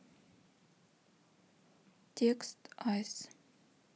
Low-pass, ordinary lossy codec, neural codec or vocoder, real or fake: none; none; none; real